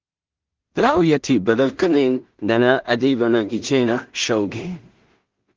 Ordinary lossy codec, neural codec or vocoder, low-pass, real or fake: Opus, 24 kbps; codec, 16 kHz in and 24 kHz out, 0.4 kbps, LongCat-Audio-Codec, two codebook decoder; 7.2 kHz; fake